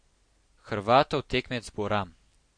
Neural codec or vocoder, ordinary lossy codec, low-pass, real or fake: none; MP3, 48 kbps; 9.9 kHz; real